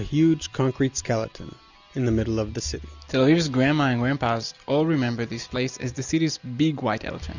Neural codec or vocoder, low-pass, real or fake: none; 7.2 kHz; real